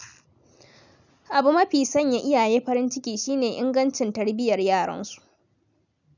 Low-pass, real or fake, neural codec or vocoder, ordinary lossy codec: 7.2 kHz; real; none; none